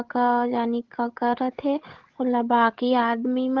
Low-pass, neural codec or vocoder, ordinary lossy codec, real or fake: 7.2 kHz; codec, 16 kHz, 16 kbps, FreqCodec, larger model; Opus, 16 kbps; fake